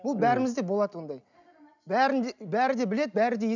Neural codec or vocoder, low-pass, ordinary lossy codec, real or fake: none; 7.2 kHz; none; real